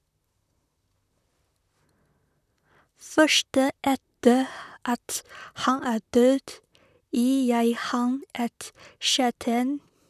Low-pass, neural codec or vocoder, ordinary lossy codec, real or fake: 14.4 kHz; vocoder, 44.1 kHz, 128 mel bands, Pupu-Vocoder; none; fake